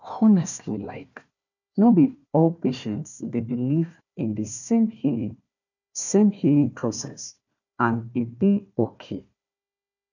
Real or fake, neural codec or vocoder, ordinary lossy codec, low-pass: fake; codec, 16 kHz, 1 kbps, FunCodec, trained on Chinese and English, 50 frames a second; none; 7.2 kHz